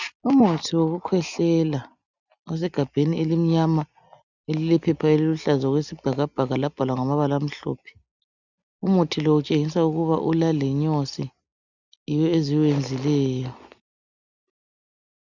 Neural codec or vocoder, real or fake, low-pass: none; real; 7.2 kHz